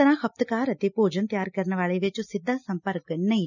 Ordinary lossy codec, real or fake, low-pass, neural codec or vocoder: none; real; 7.2 kHz; none